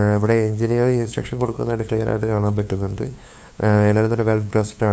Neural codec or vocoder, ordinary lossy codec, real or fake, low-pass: codec, 16 kHz, 2 kbps, FunCodec, trained on LibriTTS, 25 frames a second; none; fake; none